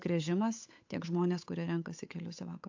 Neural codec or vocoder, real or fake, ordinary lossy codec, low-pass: codec, 16 kHz, 8 kbps, FunCodec, trained on Chinese and English, 25 frames a second; fake; AAC, 48 kbps; 7.2 kHz